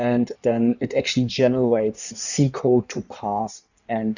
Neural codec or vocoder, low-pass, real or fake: codec, 16 kHz in and 24 kHz out, 1.1 kbps, FireRedTTS-2 codec; 7.2 kHz; fake